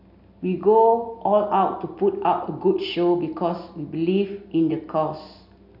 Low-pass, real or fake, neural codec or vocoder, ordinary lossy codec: 5.4 kHz; real; none; none